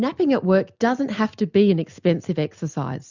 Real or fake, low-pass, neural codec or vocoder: real; 7.2 kHz; none